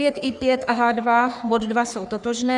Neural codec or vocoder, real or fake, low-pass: codec, 44.1 kHz, 3.4 kbps, Pupu-Codec; fake; 10.8 kHz